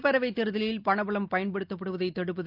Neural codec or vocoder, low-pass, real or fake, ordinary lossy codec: none; 5.4 kHz; real; Opus, 24 kbps